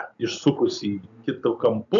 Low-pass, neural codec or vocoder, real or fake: 7.2 kHz; none; real